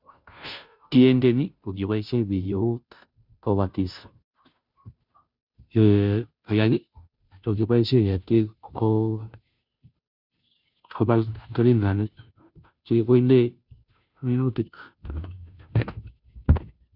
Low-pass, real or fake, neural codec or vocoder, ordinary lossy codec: 5.4 kHz; fake; codec, 16 kHz, 0.5 kbps, FunCodec, trained on Chinese and English, 25 frames a second; none